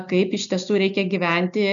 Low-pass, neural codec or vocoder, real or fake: 7.2 kHz; none; real